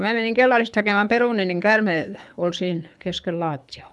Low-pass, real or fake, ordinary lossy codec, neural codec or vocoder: none; fake; none; codec, 24 kHz, 6 kbps, HILCodec